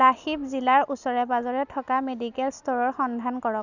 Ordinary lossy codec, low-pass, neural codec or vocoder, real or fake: none; 7.2 kHz; none; real